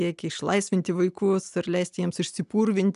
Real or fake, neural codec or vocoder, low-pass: real; none; 10.8 kHz